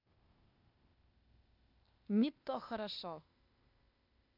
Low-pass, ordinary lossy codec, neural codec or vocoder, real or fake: 5.4 kHz; none; codec, 16 kHz, 0.8 kbps, ZipCodec; fake